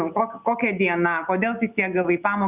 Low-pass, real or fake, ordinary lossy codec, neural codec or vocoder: 3.6 kHz; real; Opus, 64 kbps; none